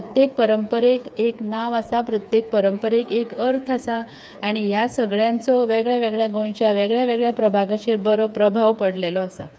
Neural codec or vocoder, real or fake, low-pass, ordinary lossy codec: codec, 16 kHz, 4 kbps, FreqCodec, smaller model; fake; none; none